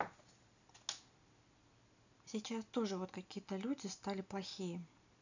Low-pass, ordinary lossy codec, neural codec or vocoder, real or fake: 7.2 kHz; none; none; real